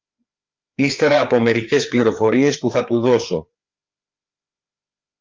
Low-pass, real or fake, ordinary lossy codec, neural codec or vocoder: 7.2 kHz; fake; Opus, 24 kbps; codec, 16 kHz, 4 kbps, FreqCodec, larger model